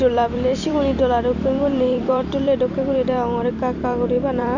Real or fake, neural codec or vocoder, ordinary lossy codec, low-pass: fake; vocoder, 44.1 kHz, 128 mel bands every 256 samples, BigVGAN v2; none; 7.2 kHz